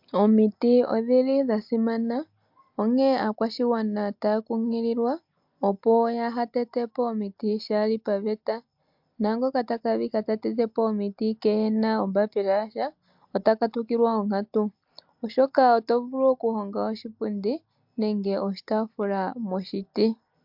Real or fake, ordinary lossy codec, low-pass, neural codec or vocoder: real; MP3, 48 kbps; 5.4 kHz; none